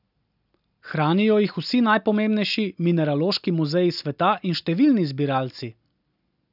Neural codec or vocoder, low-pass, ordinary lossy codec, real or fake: none; 5.4 kHz; none; real